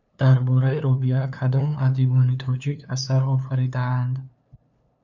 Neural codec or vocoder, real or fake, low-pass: codec, 16 kHz, 2 kbps, FunCodec, trained on LibriTTS, 25 frames a second; fake; 7.2 kHz